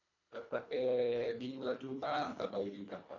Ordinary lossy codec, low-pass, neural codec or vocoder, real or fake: none; 7.2 kHz; codec, 24 kHz, 1.5 kbps, HILCodec; fake